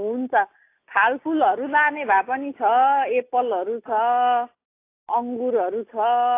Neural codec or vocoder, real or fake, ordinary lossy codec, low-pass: none; real; AAC, 24 kbps; 3.6 kHz